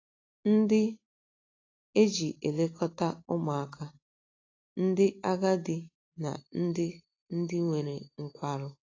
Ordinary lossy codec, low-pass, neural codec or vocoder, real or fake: AAC, 32 kbps; 7.2 kHz; none; real